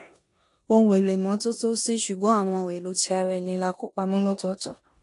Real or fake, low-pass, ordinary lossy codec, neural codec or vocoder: fake; 10.8 kHz; AAC, 48 kbps; codec, 16 kHz in and 24 kHz out, 0.9 kbps, LongCat-Audio-Codec, four codebook decoder